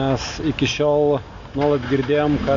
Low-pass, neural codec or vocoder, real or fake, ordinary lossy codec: 7.2 kHz; none; real; AAC, 64 kbps